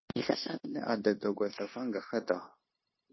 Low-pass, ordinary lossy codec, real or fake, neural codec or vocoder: 7.2 kHz; MP3, 24 kbps; fake; codec, 16 kHz, 0.9 kbps, LongCat-Audio-Codec